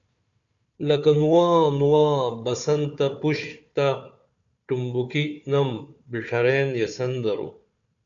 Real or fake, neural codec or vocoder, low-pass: fake; codec, 16 kHz, 4 kbps, FunCodec, trained on Chinese and English, 50 frames a second; 7.2 kHz